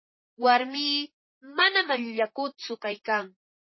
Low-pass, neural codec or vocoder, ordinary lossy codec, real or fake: 7.2 kHz; vocoder, 44.1 kHz, 128 mel bands, Pupu-Vocoder; MP3, 24 kbps; fake